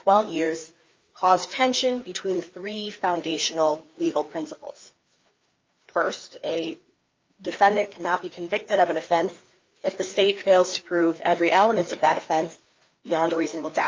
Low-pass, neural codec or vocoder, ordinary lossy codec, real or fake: 7.2 kHz; codec, 16 kHz, 2 kbps, FreqCodec, larger model; Opus, 32 kbps; fake